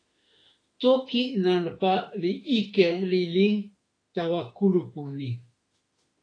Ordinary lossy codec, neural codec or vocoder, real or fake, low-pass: AAC, 32 kbps; autoencoder, 48 kHz, 32 numbers a frame, DAC-VAE, trained on Japanese speech; fake; 9.9 kHz